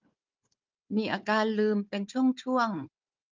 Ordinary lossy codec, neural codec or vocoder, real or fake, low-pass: Opus, 24 kbps; codec, 16 kHz, 4 kbps, FunCodec, trained on Chinese and English, 50 frames a second; fake; 7.2 kHz